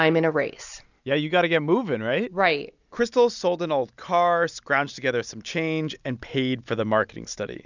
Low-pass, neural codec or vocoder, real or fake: 7.2 kHz; none; real